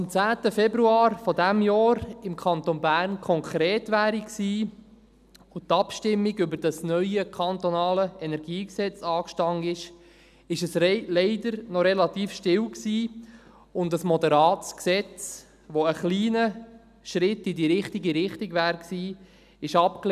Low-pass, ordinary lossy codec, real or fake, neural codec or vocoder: 14.4 kHz; none; real; none